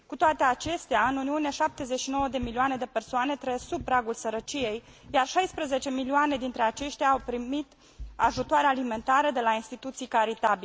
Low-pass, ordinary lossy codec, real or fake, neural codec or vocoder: none; none; real; none